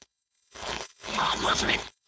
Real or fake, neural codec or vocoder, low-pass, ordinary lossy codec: fake; codec, 16 kHz, 4.8 kbps, FACodec; none; none